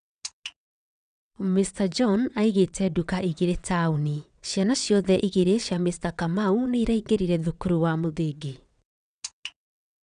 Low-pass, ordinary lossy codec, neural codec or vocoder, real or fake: 9.9 kHz; none; vocoder, 22.05 kHz, 80 mel bands, WaveNeXt; fake